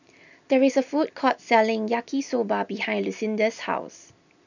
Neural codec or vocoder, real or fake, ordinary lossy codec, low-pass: none; real; none; 7.2 kHz